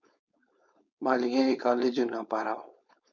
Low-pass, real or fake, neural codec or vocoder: 7.2 kHz; fake; codec, 16 kHz, 4.8 kbps, FACodec